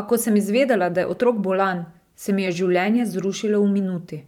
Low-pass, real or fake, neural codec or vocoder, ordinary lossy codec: 19.8 kHz; fake; vocoder, 44.1 kHz, 128 mel bands every 512 samples, BigVGAN v2; none